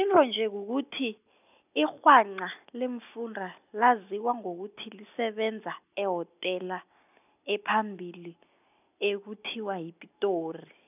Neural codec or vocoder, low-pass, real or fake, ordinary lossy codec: none; 3.6 kHz; real; none